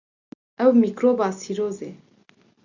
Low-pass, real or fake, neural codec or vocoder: 7.2 kHz; real; none